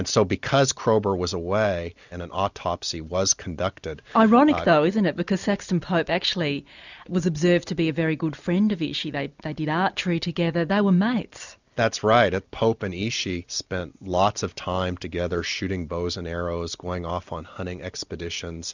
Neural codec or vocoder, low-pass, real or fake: none; 7.2 kHz; real